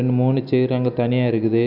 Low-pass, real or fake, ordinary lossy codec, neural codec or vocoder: 5.4 kHz; real; none; none